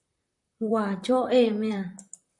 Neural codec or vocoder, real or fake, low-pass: vocoder, 44.1 kHz, 128 mel bands, Pupu-Vocoder; fake; 10.8 kHz